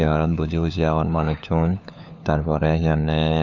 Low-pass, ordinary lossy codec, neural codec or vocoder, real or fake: 7.2 kHz; none; codec, 16 kHz, 4 kbps, FunCodec, trained on LibriTTS, 50 frames a second; fake